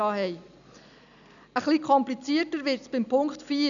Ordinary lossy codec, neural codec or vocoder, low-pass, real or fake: none; none; 7.2 kHz; real